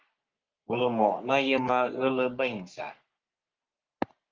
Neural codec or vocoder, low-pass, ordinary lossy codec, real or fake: codec, 44.1 kHz, 3.4 kbps, Pupu-Codec; 7.2 kHz; Opus, 24 kbps; fake